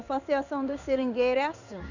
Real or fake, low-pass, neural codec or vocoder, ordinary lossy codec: fake; 7.2 kHz; codec, 16 kHz in and 24 kHz out, 1 kbps, XY-Tokenizer; none